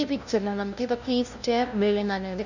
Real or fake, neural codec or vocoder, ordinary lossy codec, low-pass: fake; codec, 16 kHz, 0.5 kbps, FunCodec, trained on LibriTTS, 25 frames a second; none; 7.2 kHz